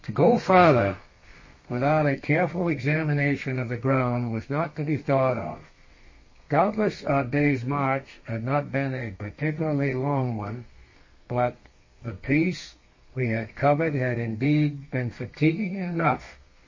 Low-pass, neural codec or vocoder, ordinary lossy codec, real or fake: 7.2 kHz; codec, 32 kHz, 1.9 kbps, SNAC; MP3, 32 kbps; fake